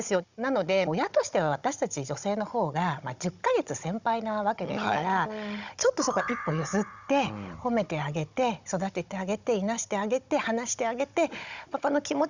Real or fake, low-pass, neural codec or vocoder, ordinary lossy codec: fake; 7.2 kHz; codec, 16 kHz, 16 kbps, FunCodec, trained on Chinese and English, 50 frames a second; Opus, 64 kbps